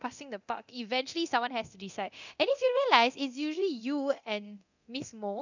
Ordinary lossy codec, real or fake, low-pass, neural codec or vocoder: none; fake; 7.2 kHz; codec, 24 kHz, 0.9 kbps, DualCodec